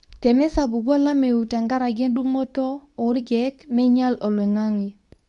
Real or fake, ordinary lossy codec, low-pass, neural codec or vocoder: fake; MP3, 96 kbps; 10.8 kHz; codec, 24 kHz, 0.9 kbps, WavTokenizer, medium speech release version 2